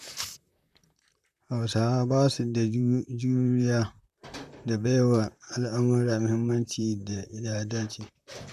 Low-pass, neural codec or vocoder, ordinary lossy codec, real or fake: 14.4 kHz; vocoder, 44.1 kHz, 128 mel bands, Pupu-Vocoder; none; fake